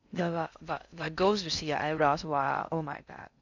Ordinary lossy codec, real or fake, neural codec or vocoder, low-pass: none; fake; codec, 16 kHz in and 24 kHz out, 0.6 kbps, FocalCodec, streaming, 4096 codes; 7.2 kHz